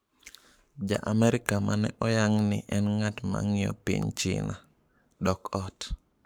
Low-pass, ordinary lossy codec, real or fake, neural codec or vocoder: none; none; fake; codec, 44.1 kHz, 7.8 kbps, Pupu-Codec